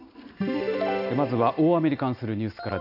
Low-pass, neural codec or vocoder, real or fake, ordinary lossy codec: 5.4 kHz; none; real; none